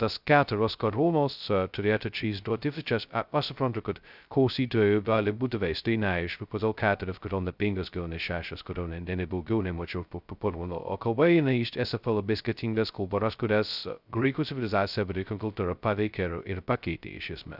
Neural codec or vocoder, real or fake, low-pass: codec, 16 kHz, 0.2 kbps, FocalCodec; fake; 5.4 kHz